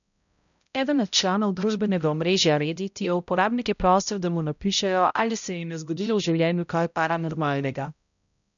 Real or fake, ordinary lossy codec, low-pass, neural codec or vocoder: fake; none; 7.2 kHz; codec, 16 kHz, 0.5 kbps, X-Codec, HuBERT features, trained on balanced general audio